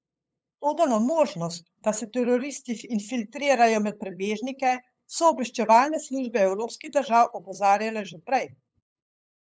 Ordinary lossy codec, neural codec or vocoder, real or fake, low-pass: none; codec, 16 kHz, 8 kbps, FunCodec, trained on LibriTTS, 25 frames a second; fake; none